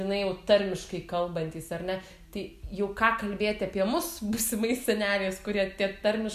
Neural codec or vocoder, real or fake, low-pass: none; real; 14.4 kHz